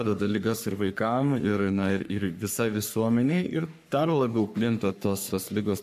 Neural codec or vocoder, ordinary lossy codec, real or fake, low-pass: codec, 44.1 kHz, 3.4 kbps, Pupu-Codec; AAC, 96 kbps; fake; 14.4 kHz